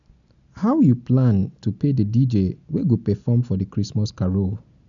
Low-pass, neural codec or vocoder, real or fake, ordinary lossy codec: 7.2 kHz; none; real; none